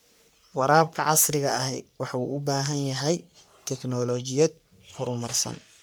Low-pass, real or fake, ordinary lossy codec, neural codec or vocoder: none; fake; none; codec, 44.1 kHz, 3.4 kbps, Pupu-Codec